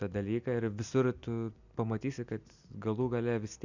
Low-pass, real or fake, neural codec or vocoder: 7.2 kHz; real; none